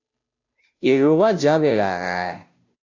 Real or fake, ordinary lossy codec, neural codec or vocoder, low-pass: fake; AAC, 48 kbps; codec, 16 kHz, 0.5 kbps, FunCodec, trained on Chinese and English, 25 frames a second; 7.2 kHz